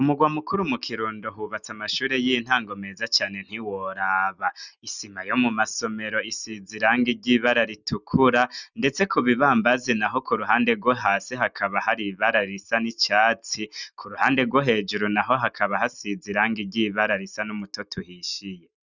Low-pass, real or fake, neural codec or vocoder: 7.2 kHz; real; none